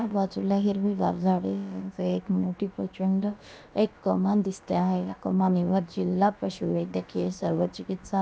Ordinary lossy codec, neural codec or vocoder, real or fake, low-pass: none; codec, 16 kHz, about 1 kbps, DyCAST, with the encoder's durations; fake; none